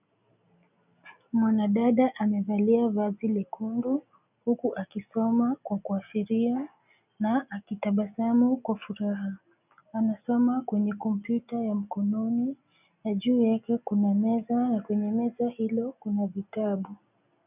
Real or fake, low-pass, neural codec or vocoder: real; 3.6 kHz; none